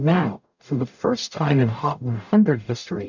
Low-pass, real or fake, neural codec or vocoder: 7.2 kHz; fake; codec, 44.1 kHz, 0.9 kbps, DAC